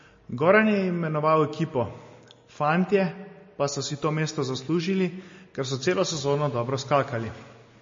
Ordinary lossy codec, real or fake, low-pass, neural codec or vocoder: MP3, 32 kbps; real; 7.2 kHz; none